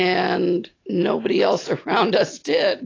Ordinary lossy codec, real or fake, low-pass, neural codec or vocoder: AAC, 32 kbps; real; 7.2 kHz; none